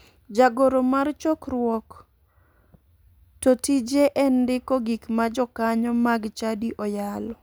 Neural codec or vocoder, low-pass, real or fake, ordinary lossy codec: none; none; real; none